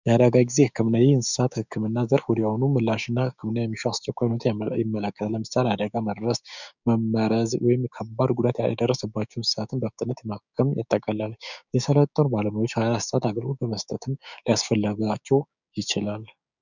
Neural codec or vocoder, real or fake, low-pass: codec, 16 kHz, 6 kbps, DAC; fake; 7.2 kHz